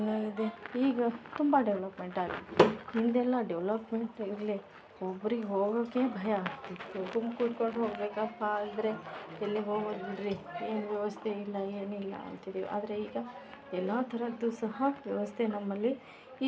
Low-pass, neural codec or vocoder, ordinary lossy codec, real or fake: none; none; none; real